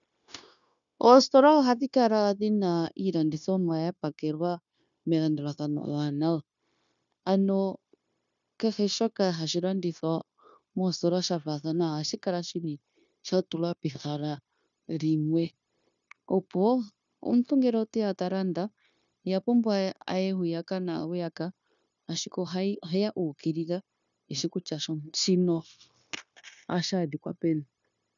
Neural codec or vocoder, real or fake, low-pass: codec, 16 kHz, 0.9 kbps, LongCat-Audio-Codec; fake; 7.2 kHz